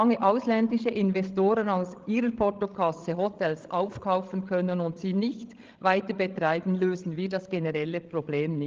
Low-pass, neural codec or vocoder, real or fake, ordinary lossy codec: 7.2 kHz; codec, 16 kHz, 16 kbps, FreqCodec, larger model; fake; Opus, 16 kbps